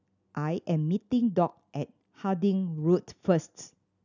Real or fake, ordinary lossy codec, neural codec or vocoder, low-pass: real; none; none; 7.2 kHz